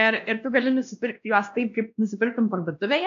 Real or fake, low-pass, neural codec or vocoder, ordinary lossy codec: fake; 7.2 kHz; codec, 16 kHz, 1 kbps, X-Codec, WavLM features, trained on Multilingual LibriSpeech; AAC, 64 kbps